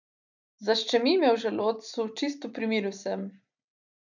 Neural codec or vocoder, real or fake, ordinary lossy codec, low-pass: none; real; none; 7.2 kHz